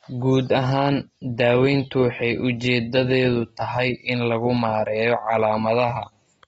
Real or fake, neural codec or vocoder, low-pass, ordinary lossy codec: real; none; 19.8 kHz; AAC, 24 kbps